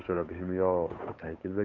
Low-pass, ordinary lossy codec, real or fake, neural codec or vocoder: 7.2 kHz; none; fake; codec, 16 kHz, 8 kbps, FunCodec, trained on Chinese and English, 25 frames a second